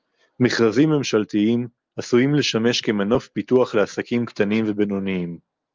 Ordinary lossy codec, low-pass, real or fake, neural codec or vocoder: Opus, 24 kbps; 7.2 kHz; real; none